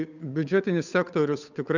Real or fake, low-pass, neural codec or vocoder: fake; 7.2 kHz; codec, 16 kHz, 8 kbps, FunCodec, trained on Chinese and English, 25 frames a second